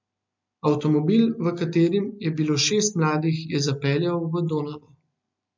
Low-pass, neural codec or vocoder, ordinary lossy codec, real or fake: 7.2 kHz; none; MP3, 64 kbps; real